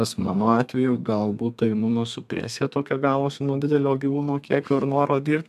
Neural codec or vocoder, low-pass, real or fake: codec, 32 kHz, 1.9 kbps, SNAC; 14.4 kHz; fake